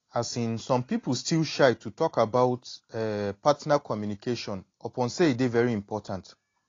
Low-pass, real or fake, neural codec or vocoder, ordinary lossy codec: 7.2 kHz; real; none; AAC, 32 kbps